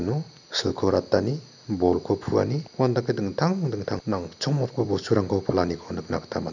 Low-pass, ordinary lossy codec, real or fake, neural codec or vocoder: 7.2 kHz; none; real; none